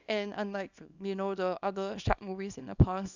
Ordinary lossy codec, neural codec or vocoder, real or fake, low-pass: none; codec, 24 kHz, 0.9 kbps, WavTokenizer, small release; fake; 7.2 kHz